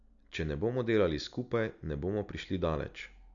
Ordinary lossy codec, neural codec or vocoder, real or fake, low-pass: none; none; real; 7.2 kHz